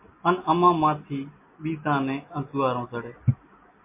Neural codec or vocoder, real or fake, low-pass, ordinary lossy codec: none; real; 3.6 kHz; MP3, 24 kbps